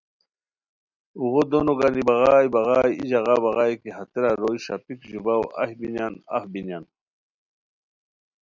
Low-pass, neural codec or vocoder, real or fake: 7.2 kHz; none; real